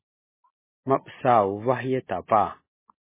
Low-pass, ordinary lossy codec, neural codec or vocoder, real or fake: 3.6 kHz; MP3, 16 kbps; none; real